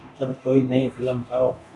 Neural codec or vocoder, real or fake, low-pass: codec, 24 kHz, 0.9 kbps, DualCodec; fake; 10.8 kHz